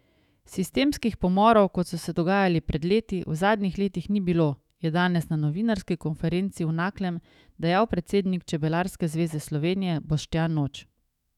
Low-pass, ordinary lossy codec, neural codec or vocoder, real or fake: 19.8 kHz; none; autoencoder, 48 kHz, 128 numbers a frame, DAC-VAE, trained on Japanese speech; fake